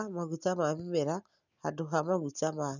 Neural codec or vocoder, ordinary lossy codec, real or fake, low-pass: none; none; real; 7.2 kHz